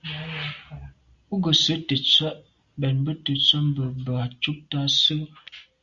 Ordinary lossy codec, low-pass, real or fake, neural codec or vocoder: Opus, 64 kbps; 7.2 kHz; real; none